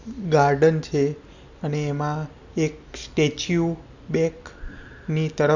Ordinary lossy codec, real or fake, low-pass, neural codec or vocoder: none; real; 7.2 kHz; none